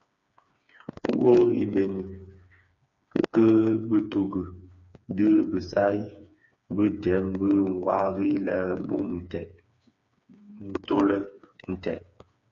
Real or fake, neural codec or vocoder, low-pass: fake; codec, 16 kHz, 4 kbps, FreqCodec, smaller model; 7.2 kHz